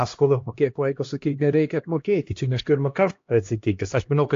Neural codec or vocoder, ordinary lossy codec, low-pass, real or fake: codec, 16 kHz, 1 kbps, X-Codec, HuBERT features, trained on LibriSpeech; AAC, 48 kbps; 7.2 kHz; fake